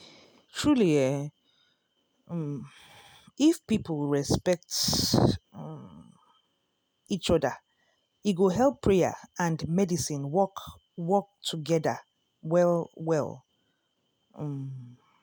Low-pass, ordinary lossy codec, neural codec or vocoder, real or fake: none; none; none; real